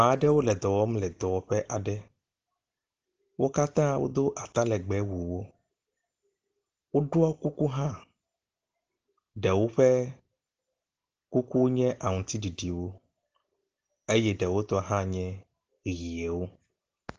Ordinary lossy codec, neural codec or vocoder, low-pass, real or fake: Opus, 16 kbps; none; 7.2 kHz; real